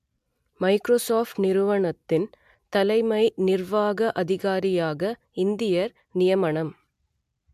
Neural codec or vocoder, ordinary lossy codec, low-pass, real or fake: none; MP3, 96 kbps; 14.4 kHz; real